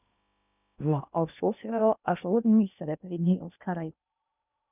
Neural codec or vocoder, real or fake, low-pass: codec, 16 kHz in and 24 kHz out, 0.6 kbps, FocalCodec, streaming, 2048 codes; fake; 3.6 kHz